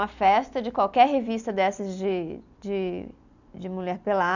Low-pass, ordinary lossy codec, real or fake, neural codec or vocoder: 7.2 kHz; none; real; none